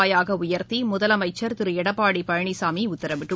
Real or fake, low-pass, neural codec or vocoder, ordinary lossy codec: real; none; none; none